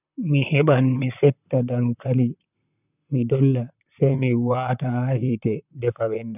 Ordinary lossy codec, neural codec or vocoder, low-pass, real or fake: none; vocoder, 44.1 kHz, 128 mel bands, Pupu-Vocoder; 3.6 kHz; fake